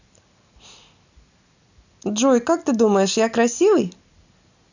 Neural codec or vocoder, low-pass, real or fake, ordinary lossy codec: none; 7.2 kHz; real; none